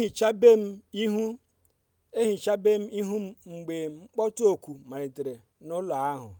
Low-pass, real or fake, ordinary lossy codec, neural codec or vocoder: none; real; none; none